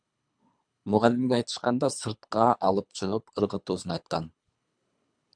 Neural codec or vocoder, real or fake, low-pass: codec, 24 kHz, 3 kbps, HILCodec; fake; 9.9 kHz